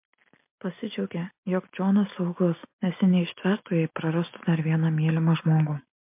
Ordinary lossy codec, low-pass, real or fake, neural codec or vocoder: MP3, 32 kbps; 3.6 kHz; real; none